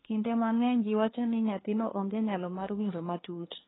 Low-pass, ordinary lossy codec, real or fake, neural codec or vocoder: 7.2 kHz; AAC, 16 kbps; fake; codec, 24 kHz, 1 kbps, SNAC